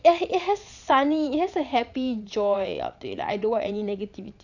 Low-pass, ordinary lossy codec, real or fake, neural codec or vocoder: 7.2 kHz; none; fake; vocoder, 22.05 kHz, 80 mel bands, Vocos